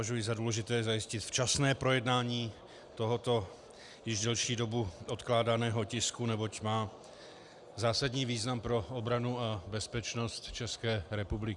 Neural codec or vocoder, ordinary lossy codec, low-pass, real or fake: none; Opus, 64 kbps; 10.8 kHz; real